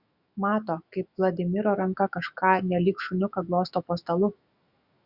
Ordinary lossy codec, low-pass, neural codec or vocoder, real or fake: Opus, 64 kbps; 5.4 kHz; autoencoder, 48 kHz, 128 numbers a frame, DAC-VAE, trained on Japanese speech; fake